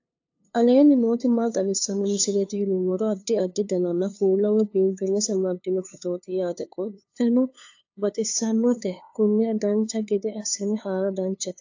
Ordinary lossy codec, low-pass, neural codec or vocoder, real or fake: AAC, 48 kbps; 7.2 kHz; codec, 16 kHz, 2 kbps, FunCodec, trained on LibriTTS, 25 frames a second; fake